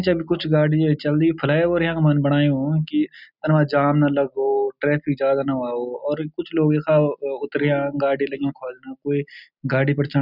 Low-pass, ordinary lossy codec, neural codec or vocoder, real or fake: 5.4 kHz; none; none; real